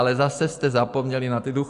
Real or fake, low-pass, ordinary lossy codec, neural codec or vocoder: real; 10.8 kHz; AAC, 64 kbps; none